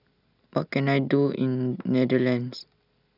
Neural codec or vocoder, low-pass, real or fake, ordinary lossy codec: none; 5.4 kHz; real; none